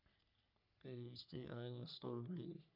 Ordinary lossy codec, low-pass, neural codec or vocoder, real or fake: none; 5.4 kHz; codec, 44.1 kHz, 3.4 kbps, Pupu-Codec; fake